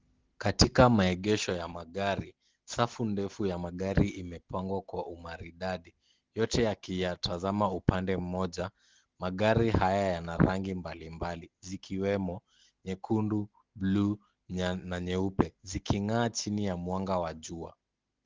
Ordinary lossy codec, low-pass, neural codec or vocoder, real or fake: Opus, 16 kbps; 7.2 kHz; none; real